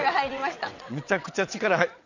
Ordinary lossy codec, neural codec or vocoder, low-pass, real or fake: none; vocoder, 22.05 kHz, 80 mel bands, WaveNeXt; 7.2 kHz; fake